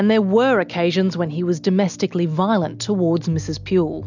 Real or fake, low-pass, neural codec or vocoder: real; 7.2 kHz; none